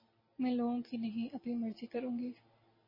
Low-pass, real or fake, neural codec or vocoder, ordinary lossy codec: 5.4 kHz; real; none; MP3, 24 kbps